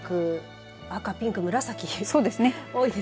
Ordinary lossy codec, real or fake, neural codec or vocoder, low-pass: none; real; none; none